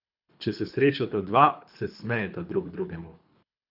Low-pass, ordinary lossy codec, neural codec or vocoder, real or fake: 5.4 kHz; none; codec, 24 kHz, 3 kbps, HILCodec; fake